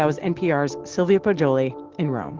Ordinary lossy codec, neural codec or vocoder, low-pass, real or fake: Opus, 16 kbps; none; 7.2 kHz; real